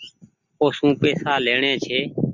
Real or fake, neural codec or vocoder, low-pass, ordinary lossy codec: real; none; 7.2 kHz; Opus, 64 kbps